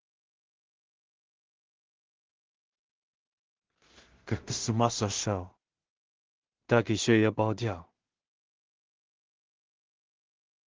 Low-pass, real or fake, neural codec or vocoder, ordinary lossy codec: 7.2 kHz; fake; codec, 16 kHz in and 24 kHz out, 0.4 kbps, LongCat-Audio-Codec, two codebook decoder; Opus, 16 kbps